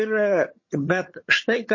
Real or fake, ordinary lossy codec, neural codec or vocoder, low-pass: fake; MP3, 32 kbps; vocoder, 22.05 kHz, 80 mel bands, HiFi-GAN; 7.2 kHz